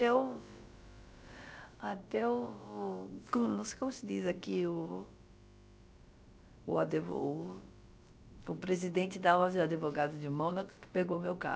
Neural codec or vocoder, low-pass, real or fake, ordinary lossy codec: codec, 16 kHz, about 1 kbps, DyCAST, with the encoder's durations; none; fake; none